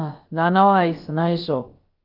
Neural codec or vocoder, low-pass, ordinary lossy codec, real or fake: codec, 16 kHz, about 1 kbps, DyCAST, with the encoder's durations; 5.4 kHz; Opus, 32 kbps; fake